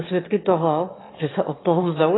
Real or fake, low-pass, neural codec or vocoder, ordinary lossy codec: fake; 7.2 kHz; autoencoder, 22.05 kHz, a latent of 192 numbers a frame, VITS, trained on one speaker; AAC, 16 kbps